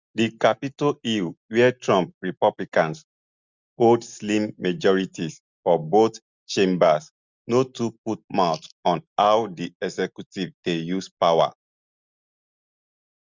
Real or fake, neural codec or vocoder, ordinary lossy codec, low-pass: real; none; Opus, 64 kbps; 7.2 kHz